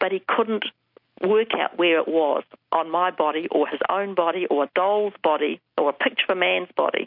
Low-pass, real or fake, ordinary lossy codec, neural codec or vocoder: 5.4 kHz; real; MP3, 48 kbps; none